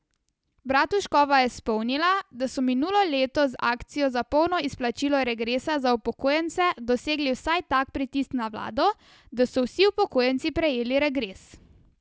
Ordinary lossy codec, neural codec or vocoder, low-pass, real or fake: none; none; none; real